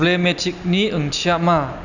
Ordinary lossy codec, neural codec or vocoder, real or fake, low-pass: none; none; real; 7.2 kHz